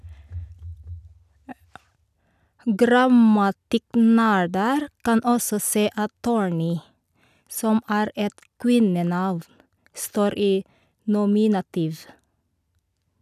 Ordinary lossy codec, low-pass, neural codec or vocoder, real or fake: none; 14.4 kHz; none; real